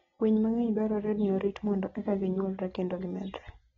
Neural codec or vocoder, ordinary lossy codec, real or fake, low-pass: none; AAC, 24 kbps; real; 7.2 kHz